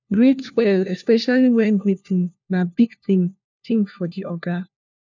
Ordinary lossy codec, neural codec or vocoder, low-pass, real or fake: none; codec, 16 kHz, 1 kbps, FunCodec, trained on LibriTTS, 50 frames a second; 7.2 kHz; fake